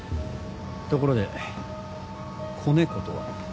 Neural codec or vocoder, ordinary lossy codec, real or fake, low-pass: none; none; real; none